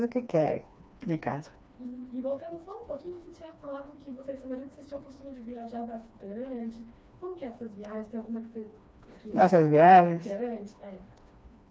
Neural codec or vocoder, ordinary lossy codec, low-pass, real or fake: codec, 16 kHz, 2 kbps, FreqCodec, smaller model; none; none; fake